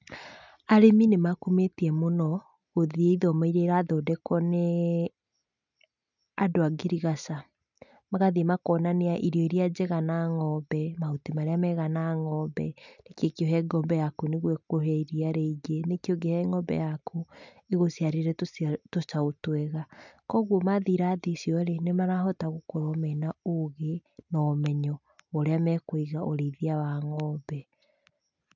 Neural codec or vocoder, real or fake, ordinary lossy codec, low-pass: none; real; none; 7.2 kHz